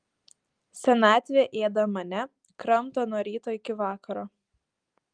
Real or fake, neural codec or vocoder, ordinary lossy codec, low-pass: real; none; Opus, 32 kbps; 9.9 kHz